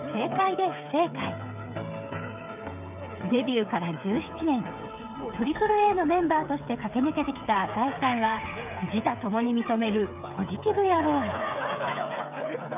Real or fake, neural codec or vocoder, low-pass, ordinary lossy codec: fake; codec, 16 kHz, 8 kbps, FreqCodec, smaller model; 3.6 kHz; none